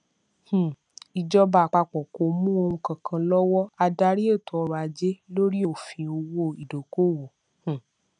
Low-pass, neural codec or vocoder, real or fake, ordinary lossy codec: 9.9 kHz; none; real; none